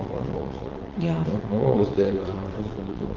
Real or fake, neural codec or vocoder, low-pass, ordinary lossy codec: fake; vocoder, 22.05 kHz, 80 mel bands, WaveNeXt; 7.2 kHz; Opus, 16 kbps